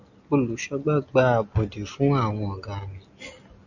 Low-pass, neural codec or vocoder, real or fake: 7.2 kHz; none; real